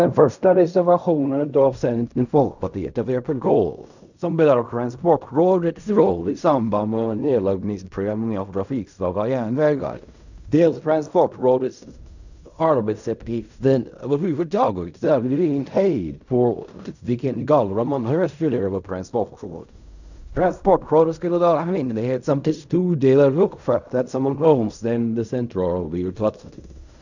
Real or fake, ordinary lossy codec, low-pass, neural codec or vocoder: fake; none; 7.2 kHz; codec, 16 kHz in and 24 kHz out, 0.4 kbps, LongCat-Audio-Codec, fine tuned four codebook decoder